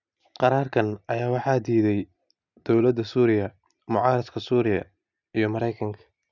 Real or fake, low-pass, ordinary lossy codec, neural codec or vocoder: real; 7.2 kHz; none; none